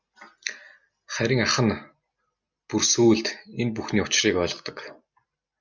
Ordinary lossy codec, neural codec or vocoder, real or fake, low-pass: Opus, 64 kbps; none; real; 7.2 kHz